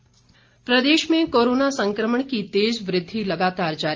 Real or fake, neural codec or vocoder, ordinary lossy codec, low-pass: real; none; Opus, 24 kbps; 7.2 kHz